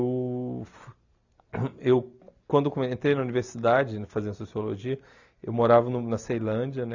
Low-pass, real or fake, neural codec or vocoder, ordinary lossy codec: 7.2 kHz; real; none; none